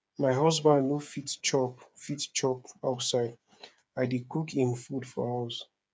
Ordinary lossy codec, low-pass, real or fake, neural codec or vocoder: none; none; fake; codec, 16 kHz, 8 kbps, FreqCodec, smaller model